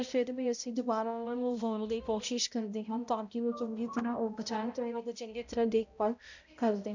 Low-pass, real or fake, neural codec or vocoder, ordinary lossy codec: 7.2 kHz; fake; codec, 16 kHz, 0.5 kbps, X-Codec, HuBERT features, trained on balanced general audio; none